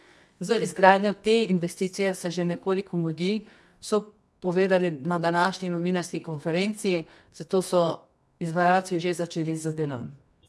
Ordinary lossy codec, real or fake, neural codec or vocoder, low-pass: none; fake; codec, 24 kHz, 0.9 kbps, WavTokenizer, medium music audio release; none